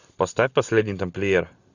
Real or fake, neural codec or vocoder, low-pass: real; none; 7.2 kHz